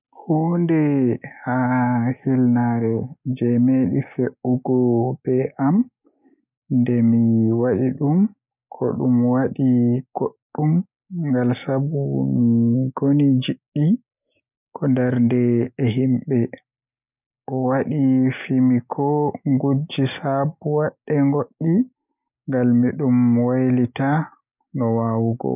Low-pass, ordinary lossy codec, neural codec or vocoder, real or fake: 3.6 kHz; none; none; real